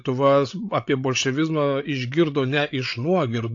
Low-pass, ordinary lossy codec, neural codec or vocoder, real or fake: 7.2 kHz; AAC, 48 kbps; codec, 16 kHz, 8 kbps, FreqCodec, larger model; fake